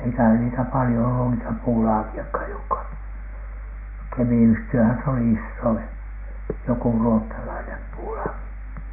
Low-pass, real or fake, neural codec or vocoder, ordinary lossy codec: 3.6 kHz; real; none; none